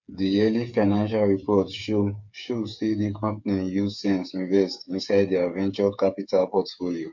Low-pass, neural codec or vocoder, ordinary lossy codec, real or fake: 7.2 kHz; codec, 16 kHz, 8 kbps, FreqCodec, smaller model; MP3, 64 kbps; fake